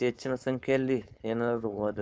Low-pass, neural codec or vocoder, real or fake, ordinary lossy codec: none; codec, 16 kHz, 4.8 kbps, FACodec; fake; none